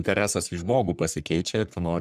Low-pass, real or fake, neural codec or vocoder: 14.4 kHz; fake; codec, 44.1 kHz, 3.4 kbps, Pupu-Codec